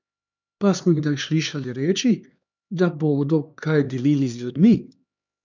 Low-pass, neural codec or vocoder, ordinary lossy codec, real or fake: 7.2 kHz; codec, 16 kHz, 2 kbps, X-Codec, HuBERT features, trained on LibriSpeech; none; fake